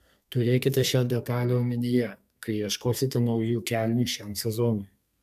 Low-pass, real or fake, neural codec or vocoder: 14.4 kHz; fake; codec, 32 kHz, 1.9 kbps, SNAC